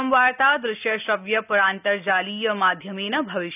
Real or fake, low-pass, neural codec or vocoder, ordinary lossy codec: real; 3.6 kHz; none; none